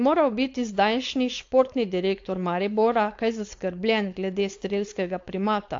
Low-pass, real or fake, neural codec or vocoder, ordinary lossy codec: 7.2 kHz; fake; codec, 16 kHz, 4.8 kbps, FACodec; AAC, 48 kbps